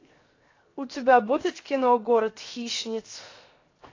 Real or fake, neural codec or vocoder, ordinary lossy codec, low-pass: fake; codec, 16 kHz, 0.7 kbps, FocalCodec; AAC, 32 kbps; 7.2 kHz